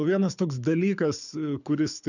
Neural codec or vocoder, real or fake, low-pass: codec, 24 kHz, 6 kbps, HILCodec; fake; 7.2 kHz